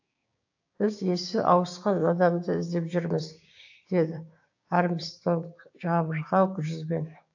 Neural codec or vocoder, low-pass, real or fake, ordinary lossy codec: codec, 16 kHz, 6 kbps, DAC; 7.2 kHz; fake; AAC, 48 kbps